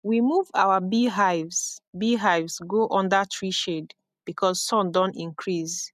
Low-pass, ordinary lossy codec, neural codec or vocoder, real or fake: 14.4 kHz; none; none; real